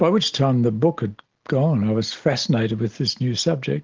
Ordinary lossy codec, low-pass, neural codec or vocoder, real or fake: Opus, 16 kbps; 7.2 kHz; none; real